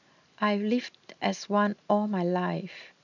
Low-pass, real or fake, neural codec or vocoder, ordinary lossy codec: 7.2 kHz; real; none; none